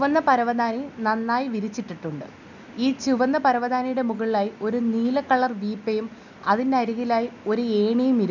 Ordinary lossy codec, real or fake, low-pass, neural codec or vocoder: none; real; 7.2 kHz; none